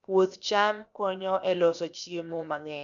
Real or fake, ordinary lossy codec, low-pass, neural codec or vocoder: fake; none; 7.2 kHz; codec, 16 kHz, 0.7 kbps, FocalCodec